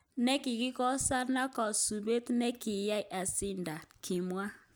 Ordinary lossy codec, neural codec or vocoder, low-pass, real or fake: none; none; none; real